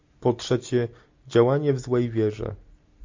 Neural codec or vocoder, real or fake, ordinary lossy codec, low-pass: none; real; MP3, 48 kbps; 7.2 kHz